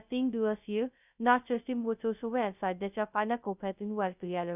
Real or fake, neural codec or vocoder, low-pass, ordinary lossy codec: fake; codec, 16 kHz, 0.2 kbps, FocalCodec; 3.6 kHz; none